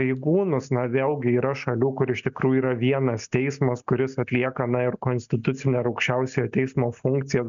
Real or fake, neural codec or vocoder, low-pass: real; none; 7.2 kHz